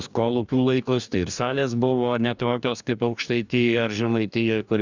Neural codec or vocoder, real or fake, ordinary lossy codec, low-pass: codec, 44.1 kHz, 2.6 kbps, DAC; fake; Opus, 64 kbps; 7.2 kHz